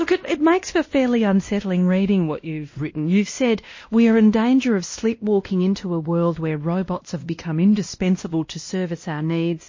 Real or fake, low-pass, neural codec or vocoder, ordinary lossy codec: fake; 7.2 kHz; codec, 16 kHz, 1 kbps, X-Codec, WavLM features, trained on Multilingual LibriSpeech; MP3, 32 kbps